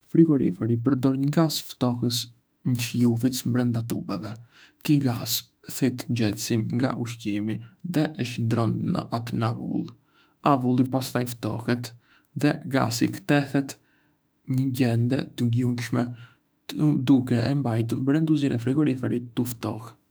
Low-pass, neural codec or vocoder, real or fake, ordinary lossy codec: none; autoencoder, 48 kHz, 32 numbers a frame, DAC-VAE, trained on Japanese speech; fake; none